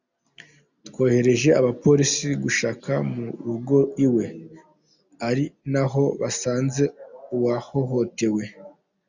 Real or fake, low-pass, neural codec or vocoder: real; 7.2 kHz; none